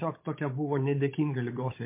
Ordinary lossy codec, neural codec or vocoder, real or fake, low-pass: MP3, 24 kbps; codec, 16 kHz, 16 kbps, FunCodec, trained on Chinese and English, 50 frames a second; fake; 3.6 kHz